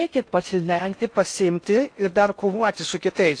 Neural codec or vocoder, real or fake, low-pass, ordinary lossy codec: codec, 16 kHz in and 24 kHz out, 0.6 kbps, FocalCodec, streaming, 4096 codes; fake; 9.9 kHz; AAC, 48 kbps